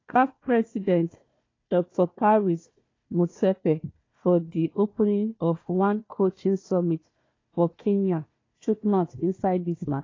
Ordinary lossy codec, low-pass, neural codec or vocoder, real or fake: AAC, 32 kbps; 7.2 kHz; codec, 16 kHz, 1 kbps, FunCodec, trained on Chinese and English, 50 frames a second; fake